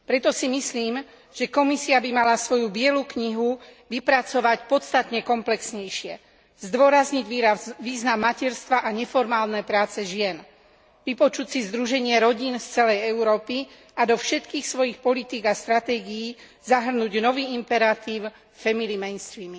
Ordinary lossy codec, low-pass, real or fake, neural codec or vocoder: none; none; real; none